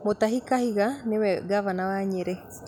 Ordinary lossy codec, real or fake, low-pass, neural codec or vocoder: none; real; none; none